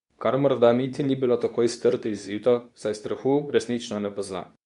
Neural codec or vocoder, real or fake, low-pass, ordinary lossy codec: codec, 24 kHz, 0.9 kbps, WavTokenizer, medium speech release version 2; fake; 10.8 kHz; none